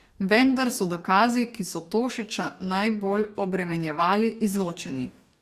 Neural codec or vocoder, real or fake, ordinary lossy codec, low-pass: codec, 44.1 kHz, 2.6 kbps, DAC; fake; Opus, 64 kbps; 14.4 kHz